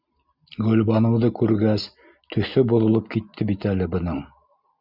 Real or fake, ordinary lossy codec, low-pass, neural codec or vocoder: real; Opus, 64 kbps; 5.4 kHz; none